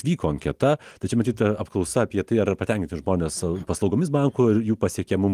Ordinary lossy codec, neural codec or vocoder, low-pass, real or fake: Opus, 24 kbps; none; 14.4 kHz; real